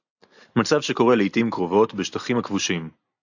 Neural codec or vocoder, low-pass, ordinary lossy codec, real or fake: none; 7.2 kHz; AAC, 48 kbps; real